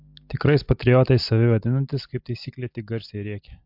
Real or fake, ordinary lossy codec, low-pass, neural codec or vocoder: real; MP3, 48 kbps; 5.4 kHz; none